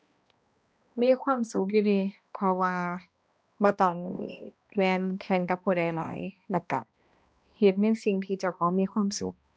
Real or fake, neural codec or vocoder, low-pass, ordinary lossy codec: fake; codec, 16 kHz, 1 kbps, X-Codec, HuBERT features, trained on balanced general audio; none; none